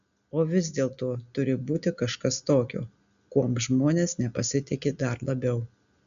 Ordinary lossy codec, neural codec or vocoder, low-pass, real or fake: AAC, 96 kbps; none; 7.2 kHz; real